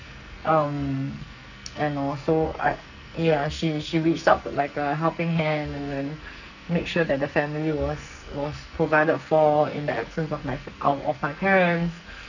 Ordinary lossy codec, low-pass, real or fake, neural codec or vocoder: none; 7.2 kHz; fake; codec, 44.1 kHz, 2.6 kbps, SNAC